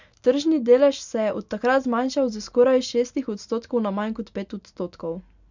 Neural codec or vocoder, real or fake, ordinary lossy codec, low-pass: none; real; none; 7.2 kHz